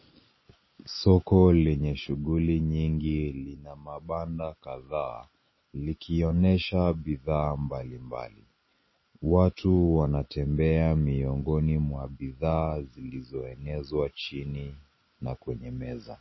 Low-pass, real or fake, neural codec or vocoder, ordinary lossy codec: 7.2 kHz; real; none; MP3, 24 kbps